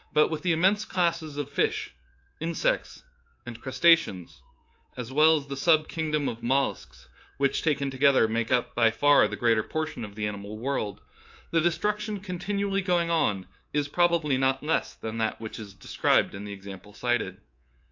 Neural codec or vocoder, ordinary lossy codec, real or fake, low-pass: codec, 24 kHz, 3.1 kbps, DualCodec; AAC, 48 kbps; fake; 7.2 kHz